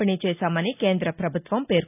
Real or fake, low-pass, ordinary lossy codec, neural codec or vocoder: real; 3.6 kHz; none; none